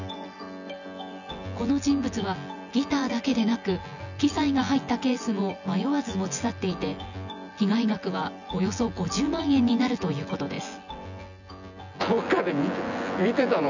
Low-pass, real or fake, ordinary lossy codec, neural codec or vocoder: 7.2 kHz; fake; none; vocoder, 24 kHz, 100 mel bands, Vocos